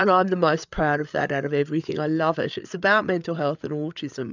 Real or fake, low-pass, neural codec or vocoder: fake; 7.2 kHz; codec, 16 kHz, 8 kbps, FreqCodec, larger model